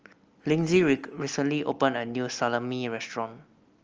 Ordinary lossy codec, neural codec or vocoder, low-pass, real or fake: Opus, 24 kbps; none; 7.2 kHz; real